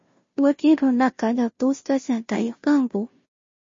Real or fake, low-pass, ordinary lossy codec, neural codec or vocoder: fake; 7.2 kHz; MP3, 32 kbps; codec, 16 kHz, 0.5 kbps, FunCodec, trained on Chinese and English, 25 frames a second